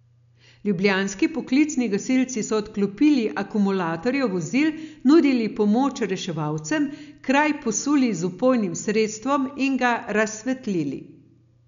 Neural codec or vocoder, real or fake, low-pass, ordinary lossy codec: none; real; 7.2 kHz; none